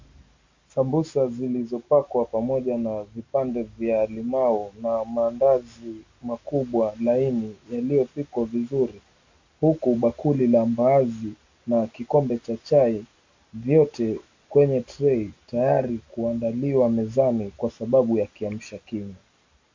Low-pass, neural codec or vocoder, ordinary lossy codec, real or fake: 7.2 kHz; none; MP3, 48 kbps; real